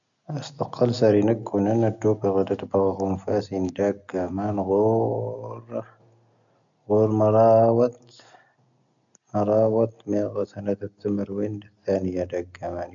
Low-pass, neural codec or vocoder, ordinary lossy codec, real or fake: 7.2 kHz; none; MP3, 96 kbps; real